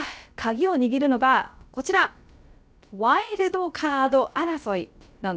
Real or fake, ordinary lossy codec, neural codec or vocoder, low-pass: fake; none; codec, 16 kHz, about 1 kbps, DyCAST, with the encoder's durations; none